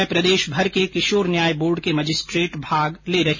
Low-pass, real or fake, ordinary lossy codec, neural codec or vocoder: 7.2 kHz; real; MP3, 32 kbps; none